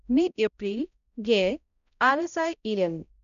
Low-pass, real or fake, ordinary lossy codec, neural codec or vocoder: 7.2 kHz; fake; none; codec, 16 kHz, 0.5 kbps, X-Codec, HuBERT features, trained on balanced general audio